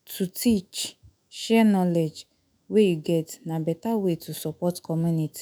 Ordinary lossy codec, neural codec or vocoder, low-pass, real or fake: none; autoencoder, 48 kHz, 128 numbers a frame, DAC-VAE, trained on Japanese speech; none; fake